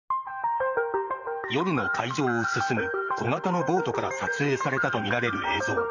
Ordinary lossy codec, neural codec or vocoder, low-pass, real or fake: none; vocoder, 44.1 kHz, 128 mel bands, Pupu-Vocoder; 7.2 kHz; fake